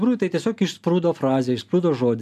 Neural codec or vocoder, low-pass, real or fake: none; 14.4 kHz; real